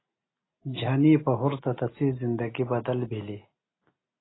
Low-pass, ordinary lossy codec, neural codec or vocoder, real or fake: 7.2 kHz; AAC, 16 kbps; none; real